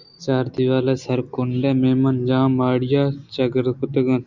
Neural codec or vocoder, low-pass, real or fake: none; 7.2 kHz; real